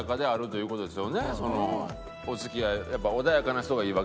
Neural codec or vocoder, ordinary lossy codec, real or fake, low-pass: none; none; real; none